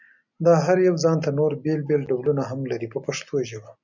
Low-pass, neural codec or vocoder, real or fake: 7.2 kHz; none; real